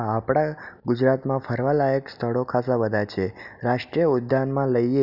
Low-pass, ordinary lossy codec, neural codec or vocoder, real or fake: 5.4 kHz; none; none; real